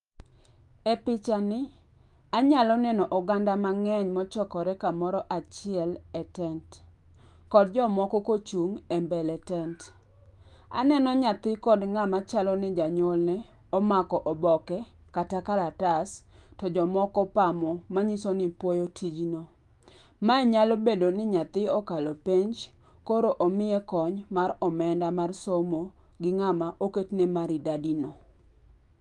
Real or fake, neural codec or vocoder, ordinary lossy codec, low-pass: fake; autoencoder, 48 kHz, 128 numbers a frame, DAC-VAE, trained on Japanese speech; Opus, 32 kbps; 10.8 kHz